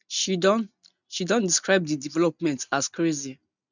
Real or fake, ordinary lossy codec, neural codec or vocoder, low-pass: real; none; none; 7.2 kHz